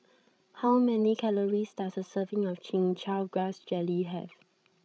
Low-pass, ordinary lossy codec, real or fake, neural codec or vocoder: none; none; fake; codec, 16 kHz, 16 kbps, FreqCodec, larger model